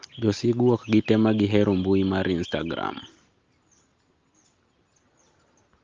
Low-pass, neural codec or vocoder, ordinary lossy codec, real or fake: 7.2 kHz; none; Opus, 32 kbps; real